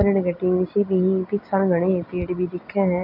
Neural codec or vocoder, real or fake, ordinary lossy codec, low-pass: none; real; none; 5.4 kHz